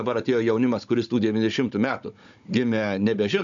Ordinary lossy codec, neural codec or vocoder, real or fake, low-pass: MP3, 64 kbps; codec, 16 kHz, 16 kbps, FunCodec, trained on Chinese and English, 50 frames a second; fake; 7.2 kHz